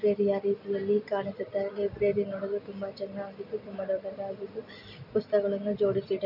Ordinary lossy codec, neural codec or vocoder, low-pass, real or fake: none; none; 5.4 kHz; real